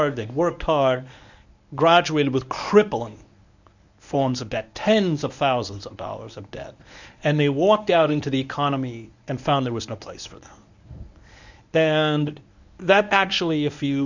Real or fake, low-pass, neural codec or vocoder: fake; 7.2 kHz; codec, 24 kHz, 0.9 kbps, WavTokenizer, medium speech release version 1